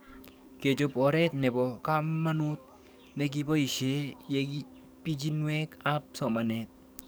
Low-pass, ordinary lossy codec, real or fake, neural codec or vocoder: none; none; fake; codec, 44.1 kHz, 7.8 kbps, DAC